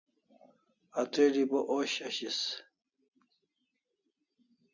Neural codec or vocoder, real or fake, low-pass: none; real; 7.2 kHz